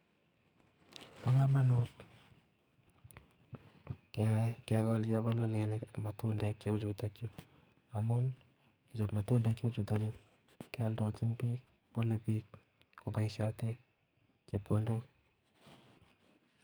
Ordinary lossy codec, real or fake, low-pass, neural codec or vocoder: none; fake; none; codec, 44.1 kHz, 2.6 kbps, SNAC